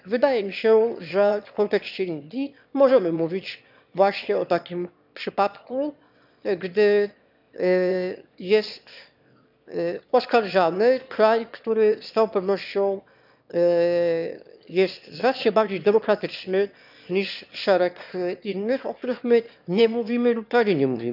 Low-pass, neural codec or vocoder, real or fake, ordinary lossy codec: 5.4 kHz; autoencoder, 22.05 kHz, a latent of 192 numbers a frame, VITS, trained on one speaker; fake; none